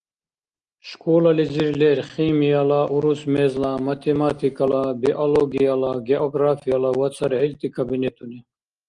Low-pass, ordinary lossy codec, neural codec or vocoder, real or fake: 9.9 kHz; Opus, 24 kbps; none; real